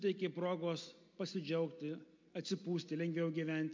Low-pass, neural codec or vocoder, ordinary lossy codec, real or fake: 7.2 kHz; none; MP3, 48 kbps; real